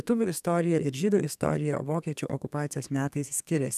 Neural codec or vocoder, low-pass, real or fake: codec, 32 kHz, 1.9 kbps, SNAC; 14.4 kHz; fake